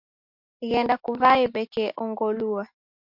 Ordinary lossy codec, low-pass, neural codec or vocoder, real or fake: MP3, 48 kbps; 5.4 kHz; none; real